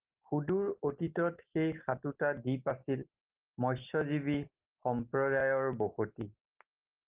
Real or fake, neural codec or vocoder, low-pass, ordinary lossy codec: real; none; 3.6 kHz; Opus, 32 kbps